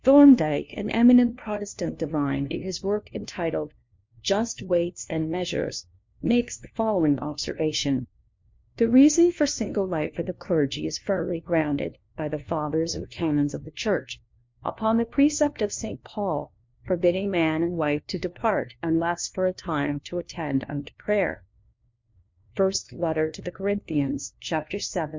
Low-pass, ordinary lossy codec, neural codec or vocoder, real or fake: 7.2 kHz; MP3, 48 kbps; codec, 16 kHz, 1 kbps, FunCodec, trained on LibriTTS, 50 frames a second; fake